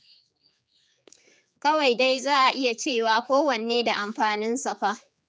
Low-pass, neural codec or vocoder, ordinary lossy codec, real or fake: none; codec, 16 kHz, 4 kbps, X-Codec, HuBERT features, trained on general audio; none; fake